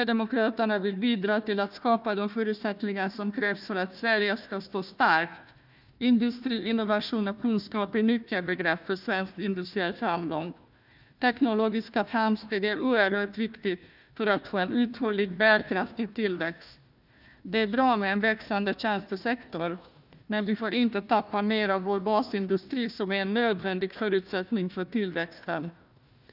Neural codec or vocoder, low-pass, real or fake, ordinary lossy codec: codec, 16 kHz, 1 kbps, FunCodec, trained on Chinese and English, 50 frames a second; 5.4 kHz; fake; none